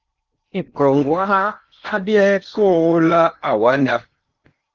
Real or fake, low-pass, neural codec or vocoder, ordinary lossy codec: fake; 7.2 kHz; codec, 16 kHz in and 24 kHz out, 0.8 kbps, FocalCodec, streaming, 65536 codes; Opus, 32 kbps